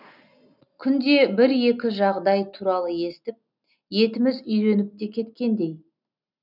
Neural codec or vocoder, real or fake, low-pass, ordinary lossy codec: none; real; 5.4 kHz; none